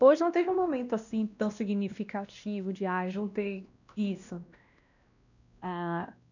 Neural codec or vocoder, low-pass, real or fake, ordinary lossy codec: codec, 16 kHz, 1 kbps, X-Codec, WavLM features, trained on Multilingual LibriSpeech; 7.2 kHz; fake; none